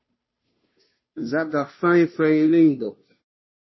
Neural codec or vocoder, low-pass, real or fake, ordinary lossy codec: codec, 16 kHz, 0.5 kbps, FunCodec, trained on Chinese and English, 25 frames a second; 7.2 kHz; fake; MP3, 24 kbps